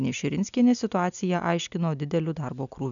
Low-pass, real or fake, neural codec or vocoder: 7.2 kHz; real; none